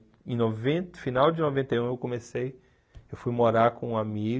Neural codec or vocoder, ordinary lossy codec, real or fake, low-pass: none; none; real; none